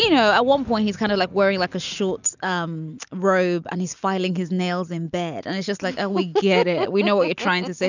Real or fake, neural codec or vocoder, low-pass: real; none; 7.2 kHz